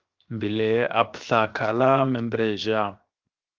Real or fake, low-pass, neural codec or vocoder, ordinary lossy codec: fake; 7.2 kHz; codec, 16 kHz, 0.7 kbps, FocalCodec; Opus, 32 kbps